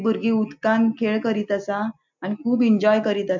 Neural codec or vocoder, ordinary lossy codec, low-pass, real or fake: none; MP3, 64 kbps; 7.2 kHz; real